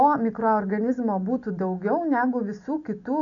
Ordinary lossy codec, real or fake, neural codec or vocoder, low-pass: AAC, 64 kbps; real; none; 7.2 kHz